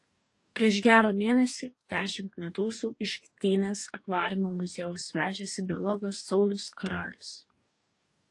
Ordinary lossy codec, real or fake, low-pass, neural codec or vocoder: AAC, 48 kbps; fake; 10.8 kHz; codec, 44.1 kHz, 2.6 kbps, DAC